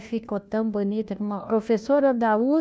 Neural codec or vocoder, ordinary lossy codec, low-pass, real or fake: codec, 16 kHz, 1 kbps, FunCodec, trained on LibriTTS, 50 frames a second; none; none; fake